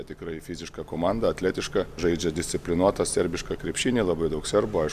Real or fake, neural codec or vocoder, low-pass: real; none; 14.4 kHz